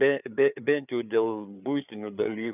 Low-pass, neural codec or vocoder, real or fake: 3.6 kHz; codec, 16 kHz, 4 kbps, FreqCodec, larger model; fake